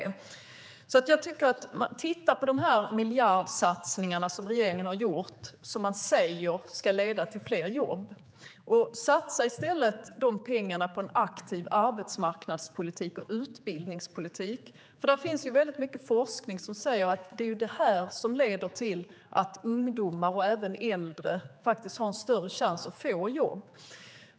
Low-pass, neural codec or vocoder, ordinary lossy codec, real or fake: none; codec, 16 kHz, 4 kbps, X-Codec, HuBERT features, trained on general audio; none; fake